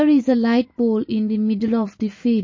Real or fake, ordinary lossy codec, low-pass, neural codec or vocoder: real; MP3, 32 kbps; 7.2 kHz; none